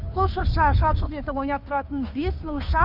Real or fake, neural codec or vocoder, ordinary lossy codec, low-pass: fake; codec, 16 kHz, 2 kbps, FunCodec, trained on Chinese and English, 25 frames a second; none; 5.4 kHz